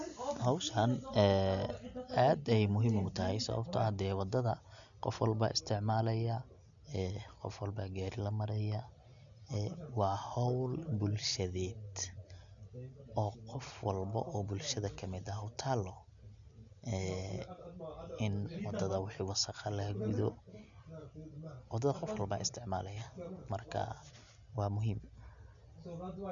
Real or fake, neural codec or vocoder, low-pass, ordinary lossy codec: real; none; 7.2 kHz; none